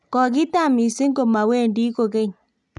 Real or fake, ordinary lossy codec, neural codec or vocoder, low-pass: real; none; none; 10.8 kHz